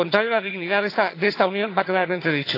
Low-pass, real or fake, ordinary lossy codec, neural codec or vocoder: 5.4 kHz; fake; AAC, 32 kbps; vocoder, 22.05 kHz, 80 mel bands, HiFi-GAN